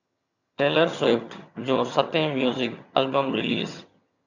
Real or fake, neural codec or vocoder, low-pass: fake; vocoder, 22.05 kHz, 80 mel bands, HiFi-GAN; 7.2 kHz